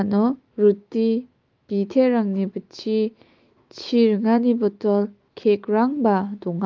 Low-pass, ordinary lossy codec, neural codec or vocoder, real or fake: 7.2 kHz; Opus, 32 kbps; autoencoder, 48 kHz, 128 numbers a frame, DAC-VAE, trained on Japanese speech; fake